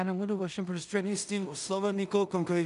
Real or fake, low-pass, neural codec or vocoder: fake; 10.8 kHz; codec, 16 kHz in and 24 kHz out, 0.4 kbps, LongCat-Audio-Codec, two codebook decoder